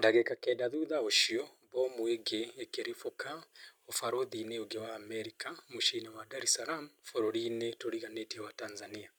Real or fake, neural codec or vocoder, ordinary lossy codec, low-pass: real; none; none; none